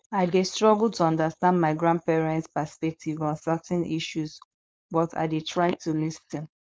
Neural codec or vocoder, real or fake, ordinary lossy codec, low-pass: codec, 16 kHz, 4.8 kbps, FACodec; fake; none; none